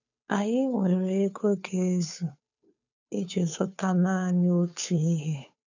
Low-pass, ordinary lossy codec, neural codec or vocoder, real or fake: 7.2 kHz; none; codec, 16 kHz, 2 kbps, FunCodec, trained on Chinese and English, 25 frames a second; fake